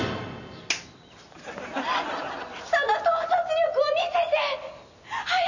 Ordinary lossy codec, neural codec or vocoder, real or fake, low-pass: none; none; real; 7.2 kHz